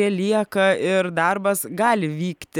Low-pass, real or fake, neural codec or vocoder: 19.8 kHz; real; none